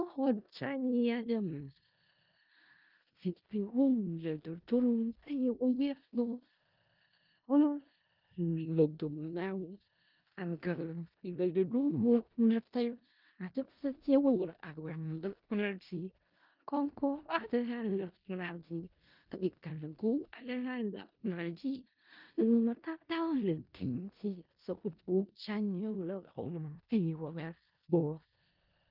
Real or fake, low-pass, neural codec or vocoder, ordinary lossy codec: fake; 5.4 kHz; codec, 16 kHz in and 24 kHz out, 0.4 kbps, LongCat-Audio-Codec, four codebook decoder; Opus, 32 kbps